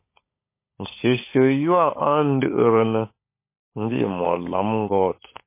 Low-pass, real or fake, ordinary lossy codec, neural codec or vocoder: 3.6 kHz; fake; MP3, 24 kbps; codec, 16 kHz, 16 kbps, FunCodec, trained on LibriTTS, 50 frames a second